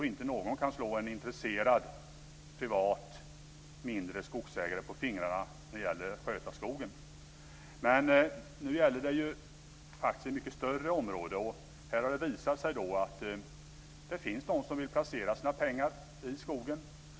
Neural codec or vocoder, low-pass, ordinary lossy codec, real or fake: none; none; none; real